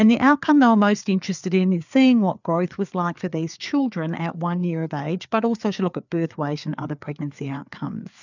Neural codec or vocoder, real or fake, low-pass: codec, 16 kHz, 4 kbps, FreqCodec, larger model; fake; 7.2 kHz